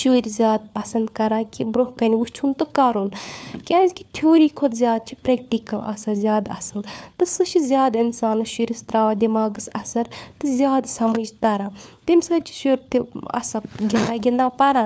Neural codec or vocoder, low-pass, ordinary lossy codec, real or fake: codec, 16 kHz, 4 kbps, FunCodec, trained on LibriTTS, 50 frames a second; none; none; fake